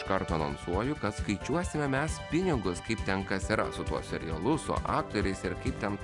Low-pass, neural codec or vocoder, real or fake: 10.8 kHz; none; real